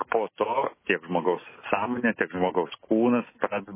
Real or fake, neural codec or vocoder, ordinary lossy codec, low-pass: real; none; MP3, 16 kbps; 3.6 kHz